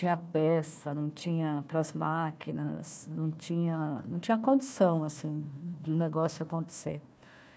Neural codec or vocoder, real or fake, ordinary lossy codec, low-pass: codec, 16 kHz, 1 kbps, FunCodec, trained on Chinese and English, 50 frames a second; fake; none; none